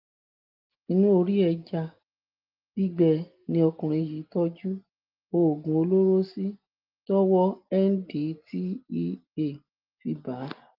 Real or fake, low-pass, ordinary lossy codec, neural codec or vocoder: real; 5.4 kHz; Opus, 24 kbps; none